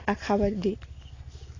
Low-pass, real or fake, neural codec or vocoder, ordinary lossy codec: 7.2 kHz; real; none; AAC, 32 kbps